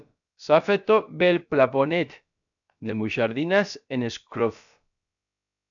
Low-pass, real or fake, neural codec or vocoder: 7.2 kHz; fake; codec, 16 kHz, about 1 kbps, DyCAST, with the encoder's durations